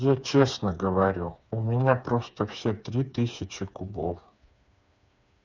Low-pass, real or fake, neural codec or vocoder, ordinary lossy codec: 7.2 kHz; fake; codec, 16 kHz, 4 kbps, FreqCodec, smaller model; none